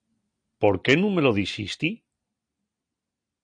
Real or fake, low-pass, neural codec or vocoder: real; 9.9 kHz; none